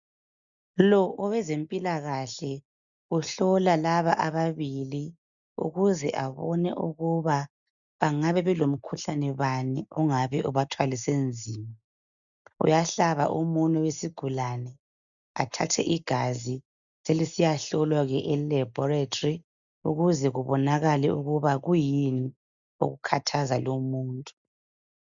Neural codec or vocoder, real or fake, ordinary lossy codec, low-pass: none; real; MP3, 96 kbps; 7.2 kHz